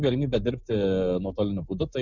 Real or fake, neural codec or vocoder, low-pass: real; none; 7.2 kHz